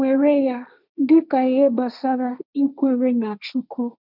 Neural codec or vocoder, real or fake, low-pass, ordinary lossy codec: codec, 16 kHz, 1.1 kbps, Voila-Tokenizer; fake; 5.4 kHz; none